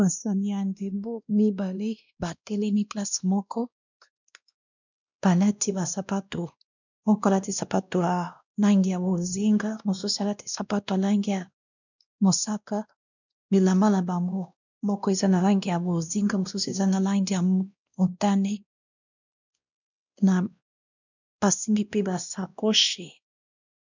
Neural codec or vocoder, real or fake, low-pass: codec, 16 kHz, 1 kbps, X-Codec, WavLM features, trained on Multilingual LibriSpeech; fake; 7.2 kHz